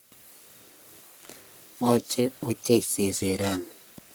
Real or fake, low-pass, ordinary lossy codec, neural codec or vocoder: fake; none; none; codec, 44.1 kHz, 1.7 kbps, Pupu-Codec